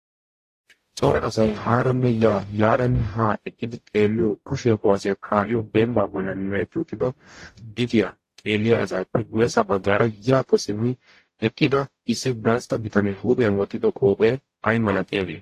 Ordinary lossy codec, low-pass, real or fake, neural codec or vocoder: AAC, 48 kbps; 14.4 kHz; fake; codec, 44.1 kHz, 0.9 kbps, DAC